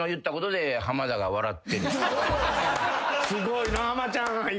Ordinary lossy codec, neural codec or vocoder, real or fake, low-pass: none; none; real; none